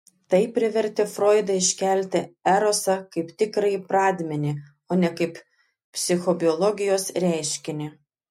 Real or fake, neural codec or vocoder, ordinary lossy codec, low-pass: real; none; MP3, 64 kbps; 19.8 kHz